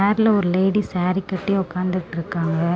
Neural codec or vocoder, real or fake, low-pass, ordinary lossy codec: none; real; none; none